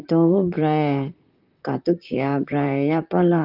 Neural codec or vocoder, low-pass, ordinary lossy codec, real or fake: vocoder, 44.1 kHz, 128 mel bands, Pupu-Vocoder; 5.4 kHz; Opus, 32 kbps; fake